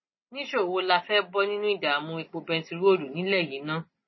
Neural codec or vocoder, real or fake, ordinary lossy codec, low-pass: none; real; MP3, 24 kbps; 7.2 kHz